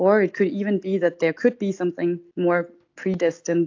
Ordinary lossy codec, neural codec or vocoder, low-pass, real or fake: AAC, 48 kbps; none; 7.2 kHz; real